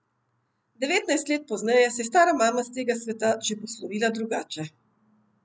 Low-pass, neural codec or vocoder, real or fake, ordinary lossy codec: none; none; real; none